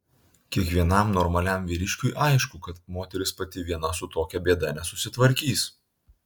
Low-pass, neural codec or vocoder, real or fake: 19.8 kHz; none; real